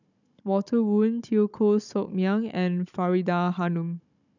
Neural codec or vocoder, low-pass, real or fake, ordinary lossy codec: codec, 16 kHz, 16 kbps, FunCodec, trained on Chinese and English, 50 frames a second; 7.2 kHz; fake; none